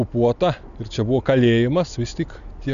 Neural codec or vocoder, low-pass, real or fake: none; 7.2 kHz; real